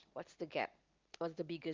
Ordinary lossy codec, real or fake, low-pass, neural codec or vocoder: Opus, 32 kbps; real; 7.2 kHz; none